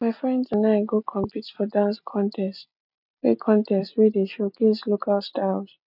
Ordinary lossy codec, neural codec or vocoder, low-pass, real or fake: none; none; 5.4 kHz; real